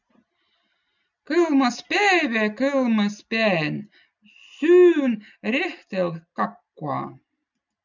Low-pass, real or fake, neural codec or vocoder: 7.2 kHz; real; none